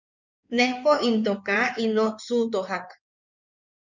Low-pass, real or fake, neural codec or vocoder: 7.2 kHz; fake; codec, 16 kHz in and 24 kHz out, 2.2 kbps, FireRedTTS-2 codec